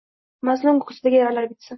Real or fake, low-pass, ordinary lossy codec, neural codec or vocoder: real; 7.2 kHz; MP3, 24 kbps; none